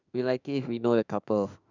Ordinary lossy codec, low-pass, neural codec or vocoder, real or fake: none; 7.2 kHz; autoencoder, 48 kHz, 32 numbers a frame, DAC-VAE, trained on Japanese speech; fake